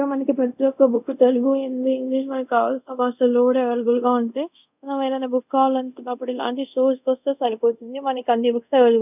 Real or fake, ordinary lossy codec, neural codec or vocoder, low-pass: fake; none; codec, 24 kHz, 0.5 kbps, DualCodec; 3.6 kHz